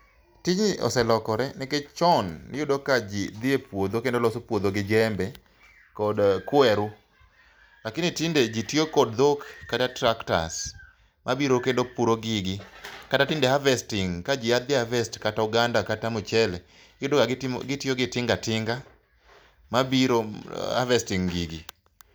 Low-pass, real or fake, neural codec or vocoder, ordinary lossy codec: none; real; none; none